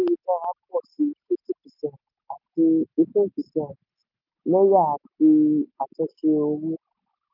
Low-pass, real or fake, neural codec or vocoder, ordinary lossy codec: 5.4 kHz; real; none; none